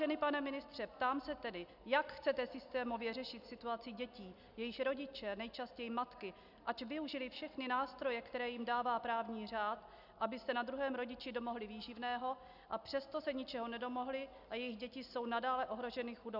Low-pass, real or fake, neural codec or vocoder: 5.4 kHz; real; none